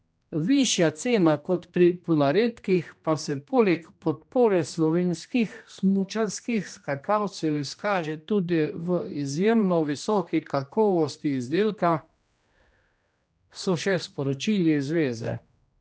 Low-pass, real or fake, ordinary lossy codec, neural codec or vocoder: none; fake; none; codec, 16 kHz, 1 kbps, X-Codec, HuBERT features, trained on general audio